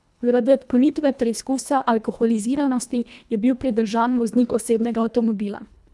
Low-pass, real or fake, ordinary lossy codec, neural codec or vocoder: none; fake; none; codec, 24 kHz, 1.5 kbps, HILCodec